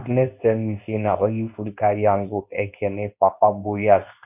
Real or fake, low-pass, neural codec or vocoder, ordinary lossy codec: fake; 3.6 kHz; codec, 24 kHz, 0.9 kbps, WavTokenizer, medium speech release version 2; none